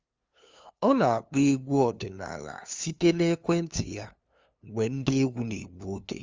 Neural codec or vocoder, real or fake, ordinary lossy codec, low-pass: codec, 16 kHz, 2 kbps, FunCodec, trained on LibriTTS, 25 frames a second; fake; Opus, 32 kbps; 7.2 kHz